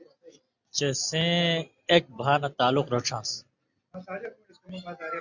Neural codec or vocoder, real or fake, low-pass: none; real; 7.2 kHz